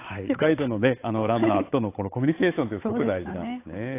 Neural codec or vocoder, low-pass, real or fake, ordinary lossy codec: codec, 16 kHz, 8 kbps, FunCodec, trained on Chinese and English, 25 frames a second; 3.6 kHz; fake; AAC, 24 kbps